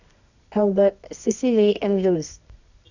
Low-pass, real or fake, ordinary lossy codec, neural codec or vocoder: 7.2 kHz; fake; none; codec, 24 kHz, 0.9 kbps, WavTokenizer, medium music audio release